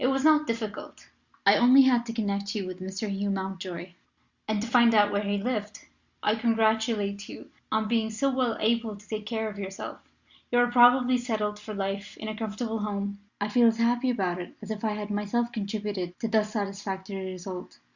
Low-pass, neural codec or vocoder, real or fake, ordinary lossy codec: 7.2 kHz; none; real; Opus, 64 kbps